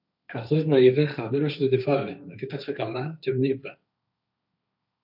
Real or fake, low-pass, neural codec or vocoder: fake; 5.4 kHz; codec, 16 kHz, 1.1 kbps, Voila-Tokenizer